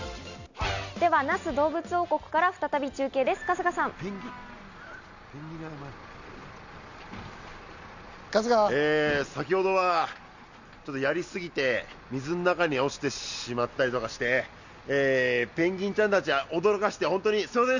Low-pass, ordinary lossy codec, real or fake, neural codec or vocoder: 7.2 kHz; none; real; none